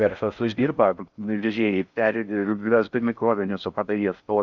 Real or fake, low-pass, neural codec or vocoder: fake; 7.2 kHz; codec, 16 kHz in and 24 kHz out, 0.6 kbps, FocalCodec, streaming, 4096 codes